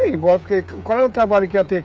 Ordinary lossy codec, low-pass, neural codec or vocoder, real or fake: none; none; codec, 16 kHz, 8 kbps, FreqCodec, smaller model; fake